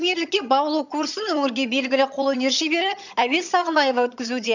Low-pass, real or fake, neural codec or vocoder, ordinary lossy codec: 7.2 kHz; fake; vocoder, 22.05 kHz, 80 mel bands, HiFi-GAN; none